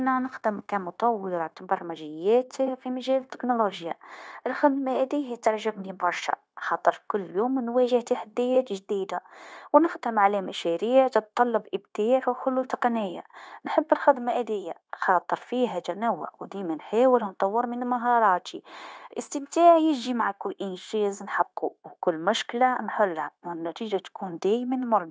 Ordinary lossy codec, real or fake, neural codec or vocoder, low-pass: none; fake; codec, 16 kHz, 0.9 kbps, LongCat-Audio-Codec; none